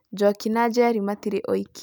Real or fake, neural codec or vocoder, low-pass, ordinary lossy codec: real; none; none; none